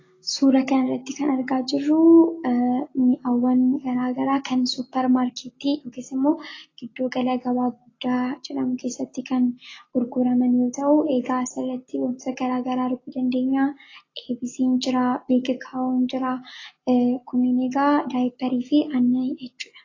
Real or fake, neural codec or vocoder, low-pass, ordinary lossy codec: real; none; 7.2 kHz; AAC, 32 kbps